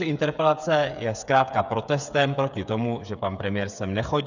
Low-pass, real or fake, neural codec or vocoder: 7.2 kHz; fake; codec, 16 kHz, 8 kbps, FreqCodec, smaller model